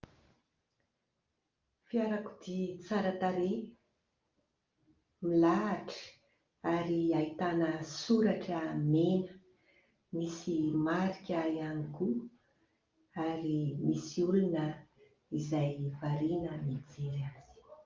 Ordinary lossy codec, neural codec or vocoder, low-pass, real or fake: Opus, 24 kbps; none; 7.2 kHz; real